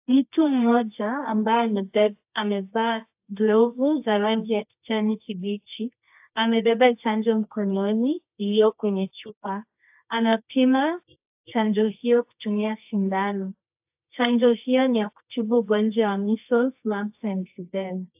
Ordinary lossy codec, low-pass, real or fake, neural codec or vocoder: AAC, 32 kbps; 3.6 kHz; fake; codec, 24 kHz, 0.9 kbps, WavTokenizer, medium music audio release